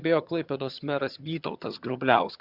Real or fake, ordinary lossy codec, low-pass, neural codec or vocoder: fake; Opus, 64 kbps; 5.4 kHz; vocoder, 22.05 kHz, 80 mel bands, HiFi-GAN